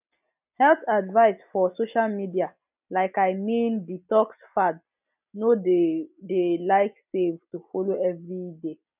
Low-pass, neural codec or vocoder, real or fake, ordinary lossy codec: 3.6 kHz; none; real; none